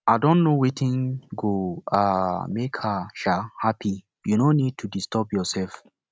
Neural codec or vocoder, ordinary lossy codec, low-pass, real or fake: none; none; none; real